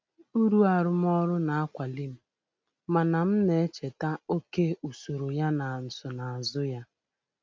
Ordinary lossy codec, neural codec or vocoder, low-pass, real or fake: none; none; none; real